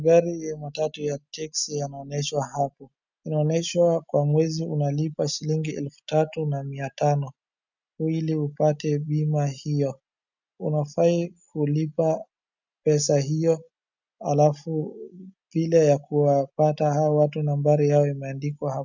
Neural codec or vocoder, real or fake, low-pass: none; real; 7.2 kHz